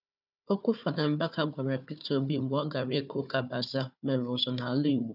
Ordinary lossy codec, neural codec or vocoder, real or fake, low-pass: none; codec, 16 kHz, 4 kbps, FunCodec, trained on Chinese and English, 50 frames a second; fake; 5.4 kHz